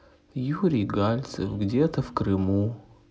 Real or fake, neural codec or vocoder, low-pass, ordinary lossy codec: real; none; none; none